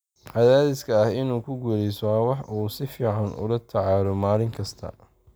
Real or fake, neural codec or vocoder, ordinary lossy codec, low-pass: real; none; none; none